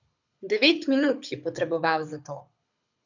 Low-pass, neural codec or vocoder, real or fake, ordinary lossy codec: 7.2 kHz; codec, 24 kHz, 6 kbps, HILCodec; fake; none